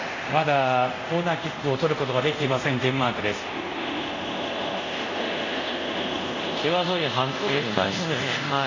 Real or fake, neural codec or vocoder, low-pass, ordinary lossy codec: fake; codec, 24 kHz, 0.5 kbps, DualCodec; 7.2 kHz; AAC, 32 kbps